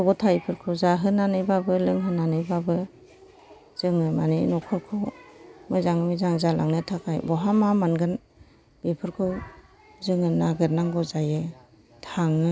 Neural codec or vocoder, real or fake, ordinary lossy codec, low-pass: none; real; none; none